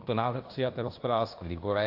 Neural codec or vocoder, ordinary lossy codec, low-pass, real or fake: codec, 16 kHz in and 24 kHz out, 0.9 kbps, LongCat-Audio-Codec, fine tuned four codebook decoder; AAC, 32 kbps; 5.4 kHz; fake